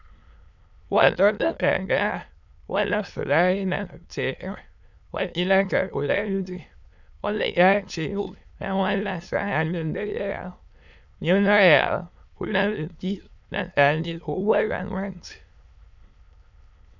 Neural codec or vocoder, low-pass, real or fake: autoencoder, 22.05 kHz, a latent of 192 numbers a frame, VITS, trained on many speakers; 7.2 kHz; fake